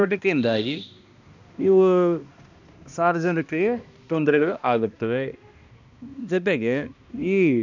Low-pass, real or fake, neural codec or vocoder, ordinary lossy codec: 7.2 kHz; fake; codec, 16 kHz, 1 kbps, X-Codec, HuBERT features, trained on balanced general audio; none